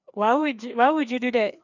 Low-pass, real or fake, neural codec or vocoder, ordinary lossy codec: 7.2 kHz; fake; codec, 16 kHz, 2 kbps, FreqCodec, larger model; none